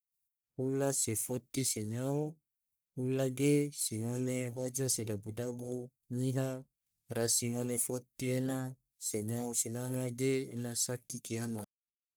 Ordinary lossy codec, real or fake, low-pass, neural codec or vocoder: none; fake; none; codec, 44.1 kHz, 1.7 kbps, Pupu-Codec